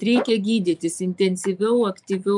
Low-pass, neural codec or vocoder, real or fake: 10.8 kHz; vocoder, 24 kHz, 100 mel bands, Vocos; fake